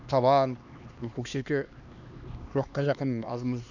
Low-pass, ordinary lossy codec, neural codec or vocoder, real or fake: 7.2 kHz; none; codec, 16 kHz, 2 kbps, X-Codec, HuBERT features, trained on LibriSpeech; fake